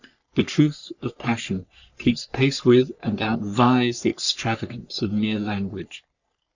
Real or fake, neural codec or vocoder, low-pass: fake; codec, 44.1 kHz, 3.4 kbps, Pupu-Codec; 7.2 kHz